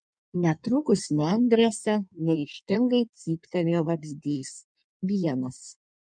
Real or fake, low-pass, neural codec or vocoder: fake; 9.9 kHz; codec, 16 kHz in and 24 kHz out, 1.1 kbps, FireRedTTS-2 codec